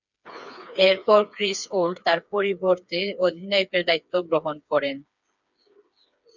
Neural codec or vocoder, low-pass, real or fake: codec, 16 kHz, 4 kbps, FreqCodec, smaller model; 7.2 kHz; fake